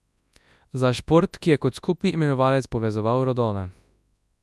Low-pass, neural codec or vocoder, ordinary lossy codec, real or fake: none; codec, 24 kHz, 0.9 kbps, WavTokenizer, large speech release; none; fake